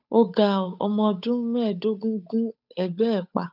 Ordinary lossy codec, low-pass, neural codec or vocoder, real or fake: none; 5.4 kHz; codec, 16 kHz, 8 kbps, FunCodec, trained on Chinese and English, 25 frames a second; fake